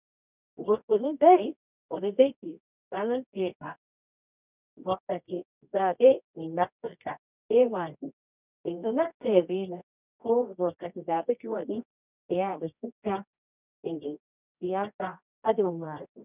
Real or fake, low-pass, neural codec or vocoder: fake; 3.6 kHz; codec, 24 kHz, 0.9 kbps, WavTokenizer, medium music audio release